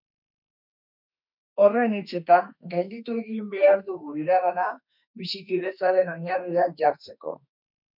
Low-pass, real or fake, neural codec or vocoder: 5.4 kHz; fake; autoencoder, 48 kHz, 32 numbers a frame, DAC-VAE, trained on Japanese speech